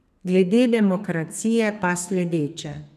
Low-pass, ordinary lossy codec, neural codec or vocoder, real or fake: 14.4 kHz; none; codec, 32 kHz, 1.9 kbps, SNAC; fake